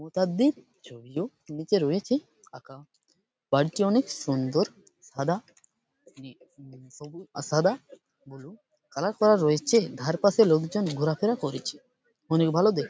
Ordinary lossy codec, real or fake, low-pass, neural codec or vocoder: none; real; none; none